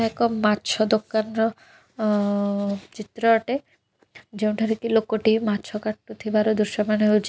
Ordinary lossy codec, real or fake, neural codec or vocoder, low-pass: none; real; none; none